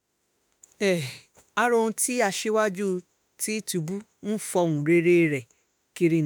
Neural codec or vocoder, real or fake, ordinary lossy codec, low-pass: autoencoder, 48 kHz, 32 numbers a frame, DAC-VAE, trained on Japanese speech; fake; none; none